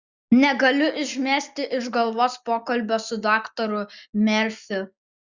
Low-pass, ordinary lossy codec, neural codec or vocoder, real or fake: 7.2 kHz; Opus, 64 kbps; none; real